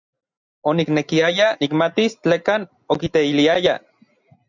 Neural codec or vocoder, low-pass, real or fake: none; 7.2 kHz; real